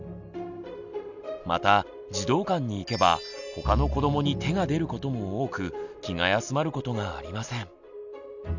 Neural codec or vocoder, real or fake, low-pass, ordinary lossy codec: none; real; 7.2 kHz; none